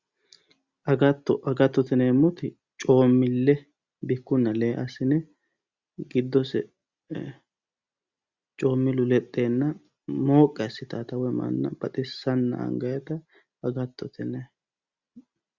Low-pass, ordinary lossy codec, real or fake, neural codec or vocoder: 7.2 kHz; Opus, 64 kbps; real; none